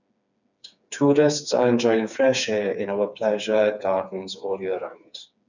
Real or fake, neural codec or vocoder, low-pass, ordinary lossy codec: fake; codec, 16 kHz, 4 kbps, FreqCodec, smaller model; 7.2 kHz; none